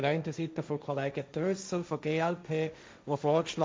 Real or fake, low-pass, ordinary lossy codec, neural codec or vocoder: fake; none; none; codec, 16 kHz, 1.1 kbps, Voila-Tokenizer